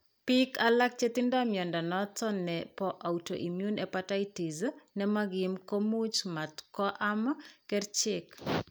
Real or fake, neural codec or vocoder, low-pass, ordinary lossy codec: real; none; none; none